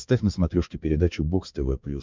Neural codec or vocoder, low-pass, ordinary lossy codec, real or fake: codec, 16 kHz, 4 kbps, X-Codec, HuBERT features, trained on balanced general audio; 7.2 kHz; MP3, 64 kbps; fake